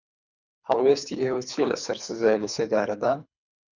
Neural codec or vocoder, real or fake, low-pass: codec, 24 kHz, 3 kbps, HILCodec; fake; 7.2 kHz